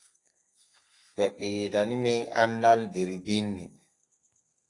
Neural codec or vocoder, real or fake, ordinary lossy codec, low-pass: codec, 32 kHz, 1.9 kbps, SNAC; fake; AAC, 48 kbps; 10.8 kHz